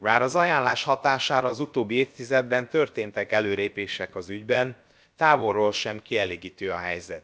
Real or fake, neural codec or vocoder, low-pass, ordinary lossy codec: fake; codec, 16 kHz, about 1 kbps, DyCAST, with the encoder's durations; none; none